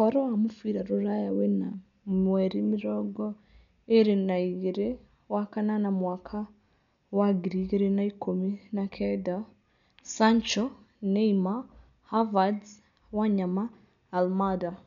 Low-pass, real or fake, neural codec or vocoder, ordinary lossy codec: 7.2 kHz; real; none; none